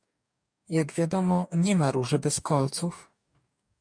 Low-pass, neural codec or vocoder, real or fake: 9.9 kHz; codec, 44.1 kHz, 2.6 kbps, DAC; fake